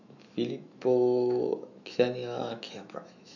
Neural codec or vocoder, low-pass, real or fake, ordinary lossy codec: autoencoder, 48 kHz, 128 numbers a frame, DAC-VAE, trained on Japanese speech; 7.2 kHz; fake; none